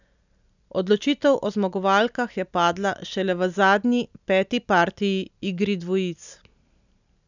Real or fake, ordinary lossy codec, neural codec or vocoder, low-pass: real; none; none; 7.2 kHz